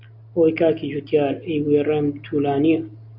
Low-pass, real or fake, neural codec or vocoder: 5.4 kHz; real; none